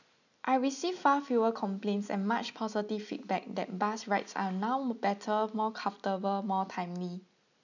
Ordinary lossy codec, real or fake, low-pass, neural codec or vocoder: none; real; 7.2 kHz; none